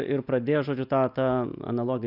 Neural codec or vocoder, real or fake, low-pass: none; real; 5.4 kHz